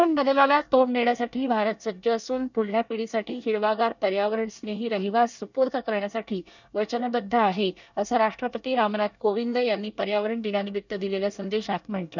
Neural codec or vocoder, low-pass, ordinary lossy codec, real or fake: codec, 24 kHz, 1 kbps, SNAC; 7.2 kHz; none; fake